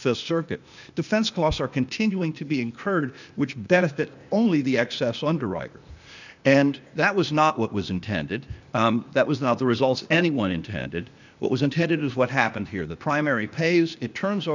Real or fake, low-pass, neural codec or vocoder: fake; 7.2 kHz; codec, 16 kHz, 0.8 kbps, ZipCodec